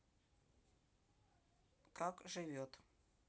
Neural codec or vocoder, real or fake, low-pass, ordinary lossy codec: none; real; none; none